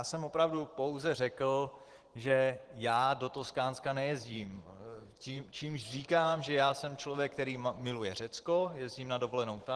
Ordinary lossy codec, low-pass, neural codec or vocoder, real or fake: Opus, 16 kbps; 10.8 kHz; vocoder, 44.1 kHz, 128 mel bands every 512 samples, BigVGAN v2; fake